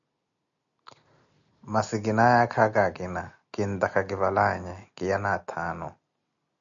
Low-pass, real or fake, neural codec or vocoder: 7.2 kHz; real; none